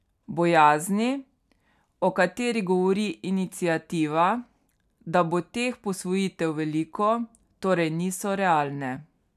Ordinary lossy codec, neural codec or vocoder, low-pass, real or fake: none; none; 14.4 kHz; real